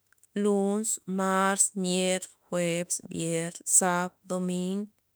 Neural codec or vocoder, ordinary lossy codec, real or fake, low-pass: autoencoder, 48 kHz, 32 numbers a frame, DAC-VAE, trained on Japanese speech; none; fake; none